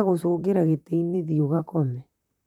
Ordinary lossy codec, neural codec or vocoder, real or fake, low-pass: none; vocoder, 44.1 kHz, 128 mel bands, Pupu-Vocoder; fake; 19.8 kHz